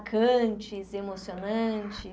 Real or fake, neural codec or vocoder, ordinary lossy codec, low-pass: real; none; none; none